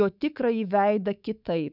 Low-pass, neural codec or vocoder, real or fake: 5.4 kHz; codec, 24 kHz, 3.1 kbps, DualCodec; fake